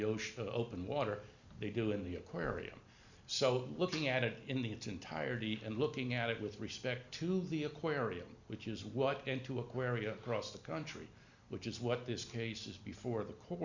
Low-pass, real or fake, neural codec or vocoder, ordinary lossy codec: 7.2 kHz; real; none; Opus, 64 kbps